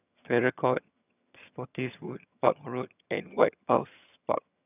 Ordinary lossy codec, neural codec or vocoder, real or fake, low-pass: none; vocoder, 22.05 kHz, 80 mel bands, HiFi-GAN; fake; 3.6 kHz